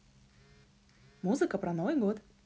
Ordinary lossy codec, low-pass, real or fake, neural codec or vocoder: none; none; real; none